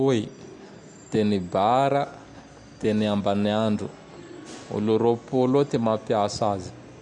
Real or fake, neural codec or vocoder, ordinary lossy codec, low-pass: real; none; none; 10.8 kHz